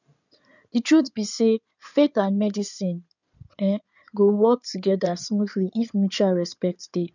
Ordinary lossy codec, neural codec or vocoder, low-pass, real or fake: none; codec, 16 kHz in and 24 kHz out, 2.2 kbps, FireRedTTS-2 codec; 7.2 kHz; fake